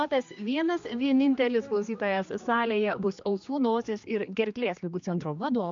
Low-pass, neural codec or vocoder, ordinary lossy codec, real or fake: 7.2 kHz; codec, 16 kHz, 2 kbps, X-Codec, HuBERT features, trained on general audio; MP3, 64 kbps; fake